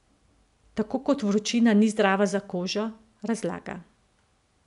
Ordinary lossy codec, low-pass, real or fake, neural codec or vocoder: none; 10.8 kHz; real; none